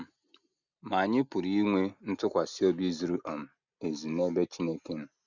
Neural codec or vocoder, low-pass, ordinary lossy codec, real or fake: none; 7.2 kHz; none; real